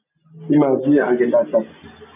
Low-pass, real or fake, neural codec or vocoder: 3.6 kHz; real; none